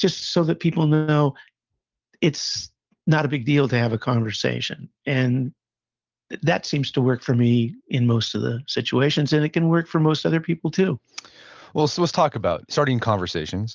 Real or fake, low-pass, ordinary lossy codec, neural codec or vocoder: real; 7.2 kHz; Opus, 24 kbps; none